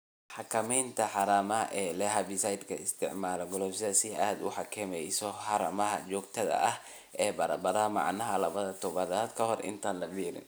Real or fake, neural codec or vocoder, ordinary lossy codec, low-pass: real; none; none; none